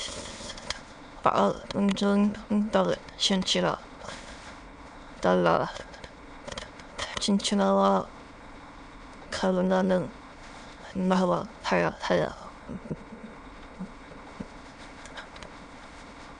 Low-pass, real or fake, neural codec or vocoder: 9.9 kHz; fake; autoencoder, 22.05 kHz, a latent of 192 numbers a frame, VITS, trained on many speakers